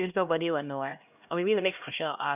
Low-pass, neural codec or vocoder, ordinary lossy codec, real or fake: 3.6 kHz; codec, 16 kHz, 1 kbps, X-Codec, HuBERT features, trained on LibriSpeech; none; fake